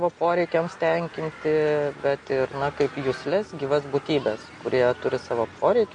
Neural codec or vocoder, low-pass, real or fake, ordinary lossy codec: none; 9.9 kHz; real; MP3, 48 kbps